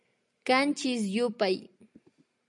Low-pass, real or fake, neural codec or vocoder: 10.8 kHz; real; none